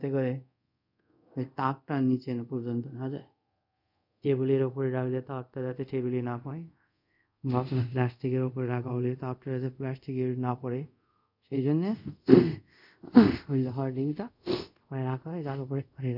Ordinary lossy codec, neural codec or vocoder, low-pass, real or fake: none; codec, 24 kHz, 0.5 kbps, DualCodec; 5.4 kHz; fake